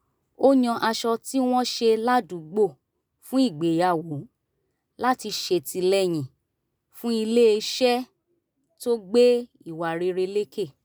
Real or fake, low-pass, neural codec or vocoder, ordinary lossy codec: real; none; none; none